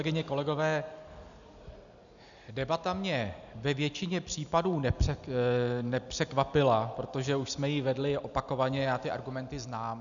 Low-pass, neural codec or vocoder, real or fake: 7.2 kHz; none; real